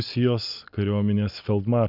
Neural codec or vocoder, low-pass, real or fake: none; 5.4 kHz; real